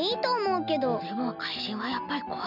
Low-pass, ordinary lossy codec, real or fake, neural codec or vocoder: 5.4 kHz; none; real; none